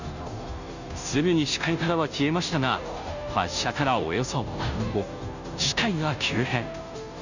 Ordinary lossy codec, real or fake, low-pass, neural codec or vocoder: none; fake; 7.2 kHz; codec, 16 kHz, 0.5 kbps, FunCodec, trained on Chinese and English, 25 frames a second